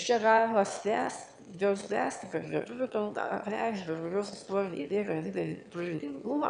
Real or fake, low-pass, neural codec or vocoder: fake; 9.9 kHz; autoencoder, 22.05 kHz, a latent of 192 numbers a frame, VITS, trained on one speaker